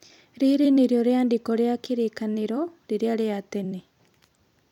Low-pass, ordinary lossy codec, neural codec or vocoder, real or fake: 19.8 kHz; none; vocoder, 44.1 kHz, 128 mel bands every 256 samples, BigVGAN v2; fake